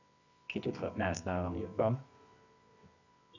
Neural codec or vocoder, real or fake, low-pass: codec, 24 kHz, 0.9 kbps, WavTokenizer, medium music audio release; fake; 7.2 kHz